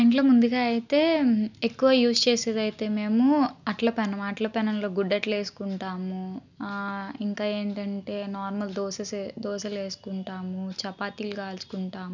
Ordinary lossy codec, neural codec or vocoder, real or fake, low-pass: none; none; real; 7.2 kHz